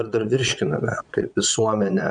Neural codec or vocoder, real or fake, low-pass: vocoder, 22.05 kHz, 80 mel bands, Vocos; fake; 9.9 kHz